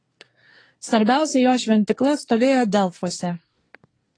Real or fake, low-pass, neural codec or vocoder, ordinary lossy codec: fake; 9.9 kHz; codec, 24 kHz, 1 kbps, SNAC; AAC, 32 kbps